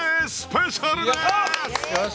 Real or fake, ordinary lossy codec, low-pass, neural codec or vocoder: real; none; none; none